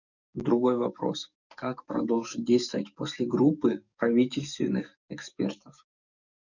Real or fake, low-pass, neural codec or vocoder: fake; 7.2 kHz; codec, 44.1 kHz, 7.8 kbps, DAC